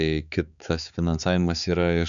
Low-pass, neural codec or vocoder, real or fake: 7.2 kHz; codec, 16 kHz, 4 kbps, X-Codec, HuBERT features, trained on balanced general audio; fake